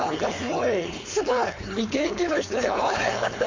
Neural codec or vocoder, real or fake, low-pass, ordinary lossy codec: codec, 16 kHz, 4.8 kbps, FACodec; fake; 7.2 kHz; MP3, 48 kbps